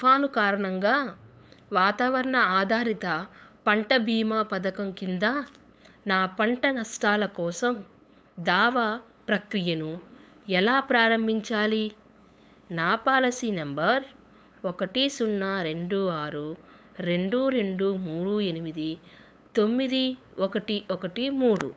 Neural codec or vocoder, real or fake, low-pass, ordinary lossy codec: codec, 16 kHz, 8 kbps, FunCodec, trained on LibriTTS, 25 frames a second; fake; none; none